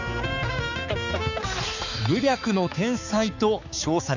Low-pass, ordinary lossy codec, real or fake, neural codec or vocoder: 7.2 kHz; none; fake; codec, 16 kHz, 4 kbps, X-Codec, HuBERT features, trained on balanced general audio